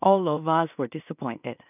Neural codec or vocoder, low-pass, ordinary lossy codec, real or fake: codec, 16 kHz in and 24 kHz out, 0.4 kbps, LongCat-Audio-Codec, two codebook decoder; 3.6 kHz; none; fake